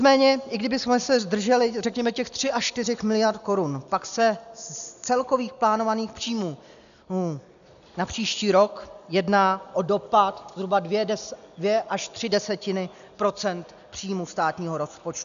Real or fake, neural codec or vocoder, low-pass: real; none; 7.2 kHz